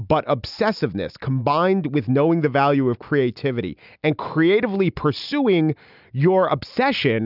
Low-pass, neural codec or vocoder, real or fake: 5.4 kHz; none; real